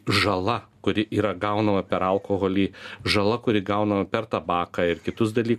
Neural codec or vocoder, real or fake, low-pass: none; real; 14.4 kHz